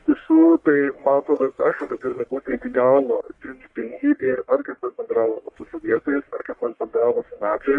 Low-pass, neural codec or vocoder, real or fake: 10.8 kHz; codec, 44.1 kHz, 1.7 kbps, Pupu-Codec; fake